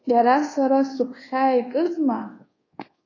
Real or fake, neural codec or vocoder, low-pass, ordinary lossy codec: fake; codec, 24 kHz, 1.2 kbps, DualCodec; 7.2 kHz; AAC, 32 kbps